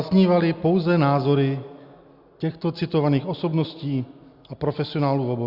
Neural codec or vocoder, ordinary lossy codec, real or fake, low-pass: none; Opus, 64 kbps; real; 5.4 kHz